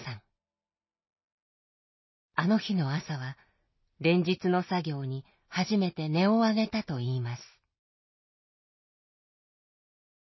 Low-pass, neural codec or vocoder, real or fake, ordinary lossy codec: 7.2 kHz; none; real; MP3, 24 kbps